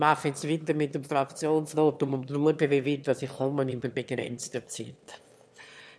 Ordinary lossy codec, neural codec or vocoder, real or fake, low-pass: none; autoencoder, 22.05 kHz, a latent of 192 numbers a frame, VITS, trained on one speaker; fake; none